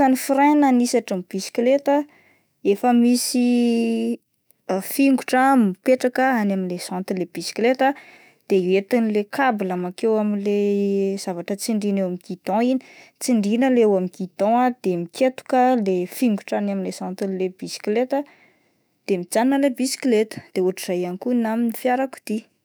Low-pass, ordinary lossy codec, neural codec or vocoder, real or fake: none; none; autoencoder, 48 kHz, 128 numbers a frame, DAC-VAE, trained on Japanese speech; fake